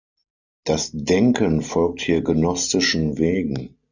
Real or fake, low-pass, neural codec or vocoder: real; 7.2 kHz; none